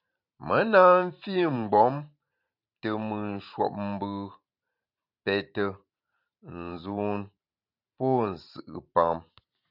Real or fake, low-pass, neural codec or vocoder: fake; 5.4 kHz; vocoder, 44.1 kHz, 128 mel bands every 512 samples, BigVGAN v2